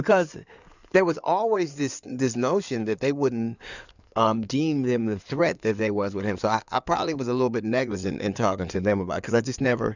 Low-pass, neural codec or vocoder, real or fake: 7.2 kHz; codec, 16 kHz in and 24 kHz out, 2.2 kbps, FireRedTTS-2 codec; fake